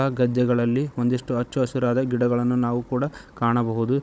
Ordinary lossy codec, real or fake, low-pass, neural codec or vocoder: none; fake; none; codec, 16 kHz, 16 kbps, FunCodec, trained on Chinese and English, 50 frames a second